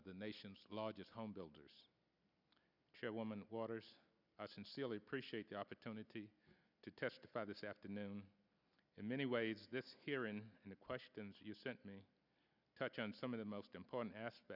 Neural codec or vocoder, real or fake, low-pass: none; real; 5.4 kHz